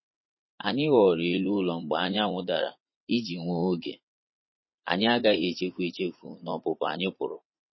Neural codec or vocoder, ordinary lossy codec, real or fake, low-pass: vocoder, 44.1 kHz, 80 mel bands, Vocos; MP3, 24 kbps; fake; 7.2 kHz